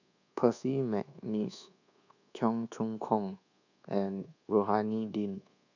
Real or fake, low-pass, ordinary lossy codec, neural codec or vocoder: fake; 7.2 kHz; none; codec, 24 kHz, 1.2 kbps, DualCodec